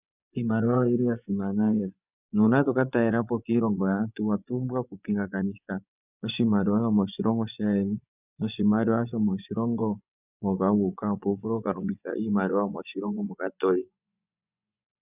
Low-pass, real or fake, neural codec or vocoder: 3.6 kHz; fake; vocoder, 24 kHz, 100 mel bands, Vocos